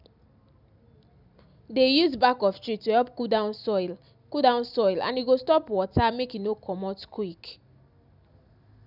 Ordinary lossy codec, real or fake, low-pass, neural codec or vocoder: none; real; 5.4 kHz; none